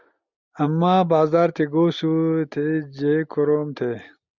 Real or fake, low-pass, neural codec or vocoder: real; 7.2 kHz; none